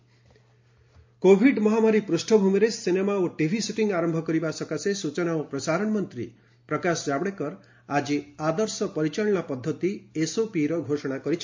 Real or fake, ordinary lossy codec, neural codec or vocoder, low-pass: real; MP3, 48 kbps; none; 7.2 kHz